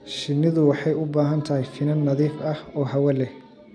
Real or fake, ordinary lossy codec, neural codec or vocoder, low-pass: real; none; none; none